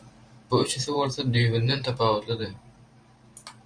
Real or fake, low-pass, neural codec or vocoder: fake; 9.9 kHz; vocoder, 44.1 kHz, 128 mel bands every 512 samples, BigVGAN v2